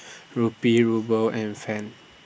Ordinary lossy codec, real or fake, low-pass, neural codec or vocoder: none; real; none; none